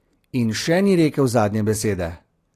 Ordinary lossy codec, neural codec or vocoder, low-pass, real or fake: AAC, 48 kbps; none; 14.4 kHz; real